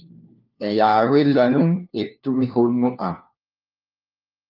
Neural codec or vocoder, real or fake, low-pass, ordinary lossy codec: codec, 16 kHz, 1 kbps, FunCodec, trained on LibriTTS, 50 frames a second; fake; 5.4 kHz; Opus, 24 kbps